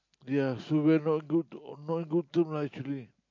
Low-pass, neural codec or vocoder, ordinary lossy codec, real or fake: 7.2 kHz; none; MP3, 48 kbps; real